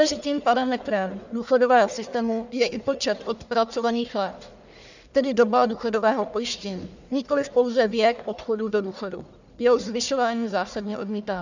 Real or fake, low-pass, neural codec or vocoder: fake; 7.2 kHz; codec, 44.1 kHz, 1.7 kbps, Pupu-Codec